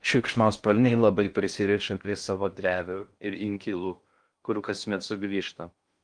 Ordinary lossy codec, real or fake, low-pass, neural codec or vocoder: Opus, 32 kbps; fake; 9.9 kHz; codec, 16 kHz in and 24 kHz out, 0.6 kbps, FocalCodec, streaming, 2048 codes